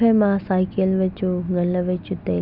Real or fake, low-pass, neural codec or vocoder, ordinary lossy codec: real; 5.4 kHz; none; none